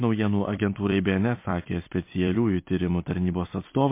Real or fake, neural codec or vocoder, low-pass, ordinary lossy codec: fake; vocoder, 44.1 kHz, 128 mel bands every 256 samples, BigVGAN v2; 3.6 kHz; MP3, 24 kbps